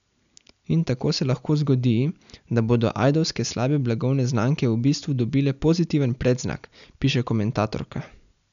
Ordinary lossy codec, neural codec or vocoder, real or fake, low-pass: none; none; real; 7.2 kHz